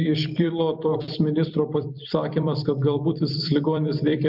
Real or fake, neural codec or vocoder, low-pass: fake; vocoder, 44.1 kHz, 128 mel bands every 256 samples, BigVGAN v2; 5.4 kHz